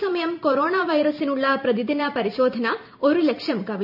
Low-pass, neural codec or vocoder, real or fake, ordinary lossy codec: 5.4 kHz; vocoder, 44.1 kHz, 128 mel bands every 512 samples, BigVGAN v2; fake; none